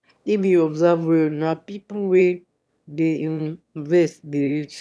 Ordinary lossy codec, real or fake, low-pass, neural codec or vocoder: none; fake; none; autoencoder, 22.05 kHz, a latent of 192 numbers a frame, VITS, trained on one speaker